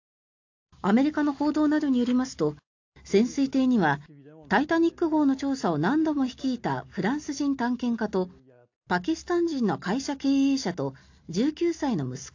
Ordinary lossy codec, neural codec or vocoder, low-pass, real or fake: AAC, 48 kbps; none; 7.2 kHz; real